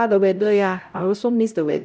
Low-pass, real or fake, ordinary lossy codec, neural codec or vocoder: none; fake; none; codec, 16 kHz, 0.5 kbps, X-Codec, HuBERT features, trained on LibriSpeech